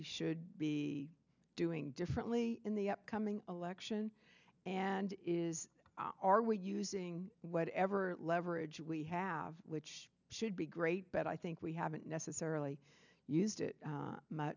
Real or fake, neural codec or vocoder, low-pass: real; none; 7.2 kHz